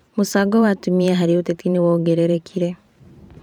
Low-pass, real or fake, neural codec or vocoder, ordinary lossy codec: 19.8 kHz; fake; vocoder, 44.1 kHz, 128 mel bands every 512 samples, BigVGAN v2; none